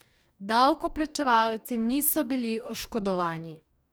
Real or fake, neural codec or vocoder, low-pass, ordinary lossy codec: fake; codec, 44.1 kHz, 2.6 kbps, DAC; none; none